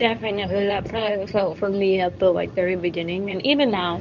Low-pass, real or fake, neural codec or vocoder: 7.2 kHz; fake; codec, 24 kHz, 0.9 kbps, WavTokenizer, medium speech release version 2